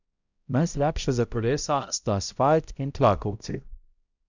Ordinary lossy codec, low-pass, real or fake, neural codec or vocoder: none; 7.2 kHz; fake; codec, 16 kHz, 0.5 kbps, X-Codec, HuBERT features, trained on balanced general audio